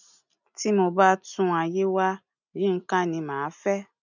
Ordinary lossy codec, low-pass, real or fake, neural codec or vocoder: none; 7.2 kHz; real; none